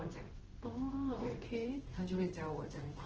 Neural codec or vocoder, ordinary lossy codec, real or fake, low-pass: codec, 16 kHz in and 24 kHz out, 1 kbps, XY-Tokenizer; Opus, 16 kbps; fake; 7.2 kHz